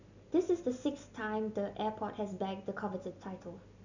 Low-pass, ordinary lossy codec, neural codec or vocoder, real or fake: 7.2 kHz; none; none; real